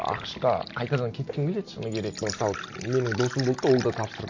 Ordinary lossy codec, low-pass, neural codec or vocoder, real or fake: MP3, 48 kbps; 7.2 kHz; none; real